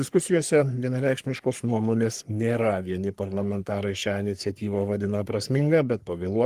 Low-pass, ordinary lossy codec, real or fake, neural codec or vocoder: 14.4 kHz; Opus, 24 kbps; fake; codec, 44.1 kHz, 3.4 kbps, Pupu-Codec